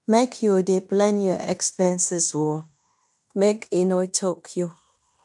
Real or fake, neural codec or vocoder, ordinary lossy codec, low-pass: fake; codec, 16 kHz in and 24 kHz out, 0.9 kbps, LongCat-Audio-Codec, fine tuned four codebook decoder; none; 10.8 kHz